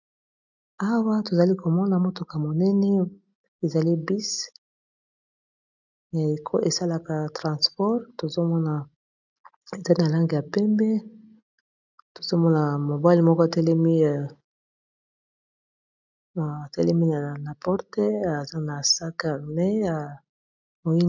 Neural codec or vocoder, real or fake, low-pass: none; real; 7.2 kHz